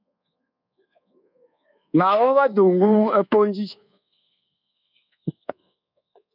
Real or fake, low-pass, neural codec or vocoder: fake; 5.4 kHz; codec, 24 kHz, 1.2 kbps, DualCodec